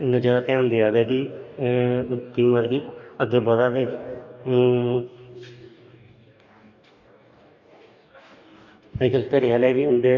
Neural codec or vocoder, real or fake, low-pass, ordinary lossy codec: codec, 44.1 kHz, 2.6 kbps, DAC; fake; 7.2 kHz; none